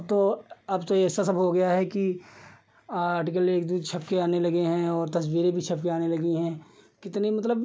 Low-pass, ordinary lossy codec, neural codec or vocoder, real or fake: none; none; none; real